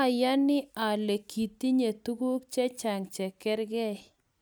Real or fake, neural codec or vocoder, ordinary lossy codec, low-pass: real; none; none; none